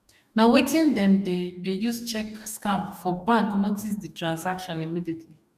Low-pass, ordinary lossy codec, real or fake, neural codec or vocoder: 14.4 kHz; none; fake; codec, 44.1 kHz, 2.6 kbps, DAC